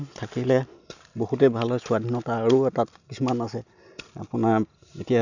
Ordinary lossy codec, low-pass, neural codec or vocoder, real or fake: none; 7.2 kHz; none; real